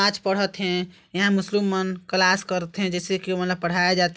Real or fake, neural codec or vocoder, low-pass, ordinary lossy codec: real; none; none; none